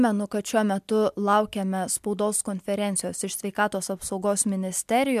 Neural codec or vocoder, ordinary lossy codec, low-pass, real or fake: none; MP3, 96 kbps; 14.4 kHz; real